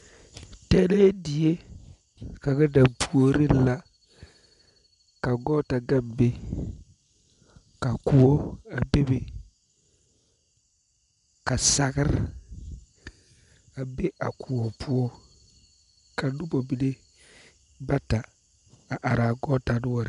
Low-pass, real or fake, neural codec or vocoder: 10.8 kHz; real; none